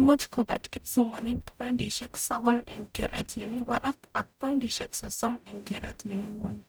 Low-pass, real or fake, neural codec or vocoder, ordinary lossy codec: none; fake; codec, 44.1 kHz, 0.9 kbps, DAC; none